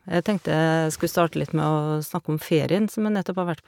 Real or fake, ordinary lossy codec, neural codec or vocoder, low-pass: real; MP3, 96 kbps; none; 19.8 kHz